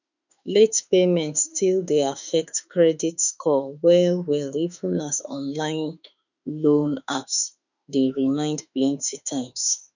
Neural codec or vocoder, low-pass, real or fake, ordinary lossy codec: autoencoder, 48 kHz, 32 numbers a frame, DAC-VAE, trained on Japanese speech; 7.2 kHz; fake; none